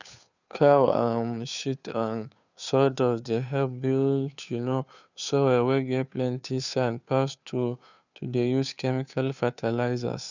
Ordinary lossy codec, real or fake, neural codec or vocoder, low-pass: none; fake; codec, 16 kHz, 2 kbps, FunCodec, trained on Chinese and English, 25 frames a second; 7.2 kHz